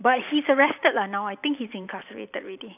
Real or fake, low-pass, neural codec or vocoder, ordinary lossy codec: fake; 3.6 kHz; vocoder, 44.1 kHz, 128 mel bands every 512 samples, BigVGAN v2; none